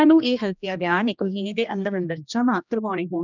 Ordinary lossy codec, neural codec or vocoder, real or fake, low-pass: none; codec, 16 kHz, 1 kbps, X-Codec, HuBERT features, trained on general audio; fake; 7.2 kHz